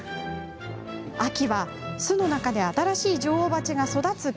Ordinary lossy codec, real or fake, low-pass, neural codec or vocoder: none; real; none; none